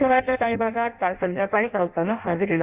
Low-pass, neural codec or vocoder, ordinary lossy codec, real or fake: 3.6 kHz; codec, 16 kHz in and 24 kHz out, 0.6 kbps, FireRedTTS-2 codec; Opus, 64 kbps; fake